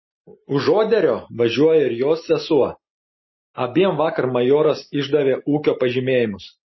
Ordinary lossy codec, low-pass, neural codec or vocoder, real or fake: MP3, 24 kbps; 7.2 kHz; none; real